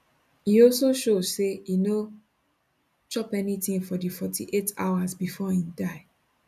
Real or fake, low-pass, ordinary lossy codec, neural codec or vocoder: real; 14.4 kHz; none; none